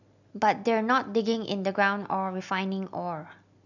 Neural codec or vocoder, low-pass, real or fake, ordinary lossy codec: none; 7.2 kHz; real; none